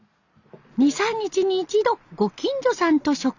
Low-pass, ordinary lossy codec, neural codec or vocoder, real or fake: 7.2 kHz; none; none; real